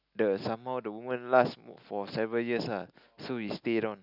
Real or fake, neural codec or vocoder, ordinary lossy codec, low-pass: real; none; none; 5.4 kHz